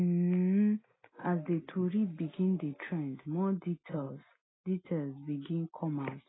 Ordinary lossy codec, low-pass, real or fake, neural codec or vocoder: AAC, 16 kbps; 7.2 kHz; real; none